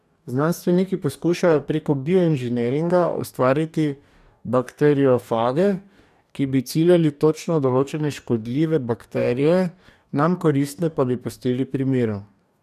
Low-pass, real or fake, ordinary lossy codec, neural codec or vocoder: 14.4 kHz; fake; none; codec, 44.1 kHz, 2.6 kbps, DAC